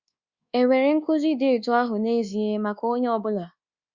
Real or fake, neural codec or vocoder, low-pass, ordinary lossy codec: fake; codec, 24 kHz, 1.2 kbps, DualCodec; 7.2 kHz; Opus, 64 kbps